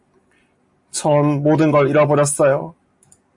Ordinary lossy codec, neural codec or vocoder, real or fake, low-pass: MP3, 48 kbps; none; real; 10.8 kHz